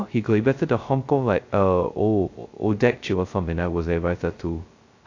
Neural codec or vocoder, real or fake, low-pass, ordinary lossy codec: codec, 16 kHz, 0.2 kbps, FocalCodec; fake; 7.2 kHz; AAC, 48 kbps